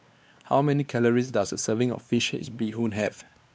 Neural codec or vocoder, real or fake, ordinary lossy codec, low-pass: codec, 16 kHz, 2 kbps, X-Codec, WavLM features, trained on Multilingual LibriSpeech; fake; none; none